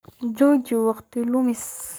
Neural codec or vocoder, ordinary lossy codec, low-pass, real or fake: codec, 44.1 kHz, 7.8 kbps, Pupu-Codec; none; none; fake